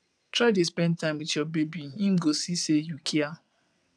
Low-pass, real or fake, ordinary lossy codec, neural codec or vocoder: 9.9 kHz; fake; none; vocoder, 22.05 kHz, 80 mel bands, WaveNeXt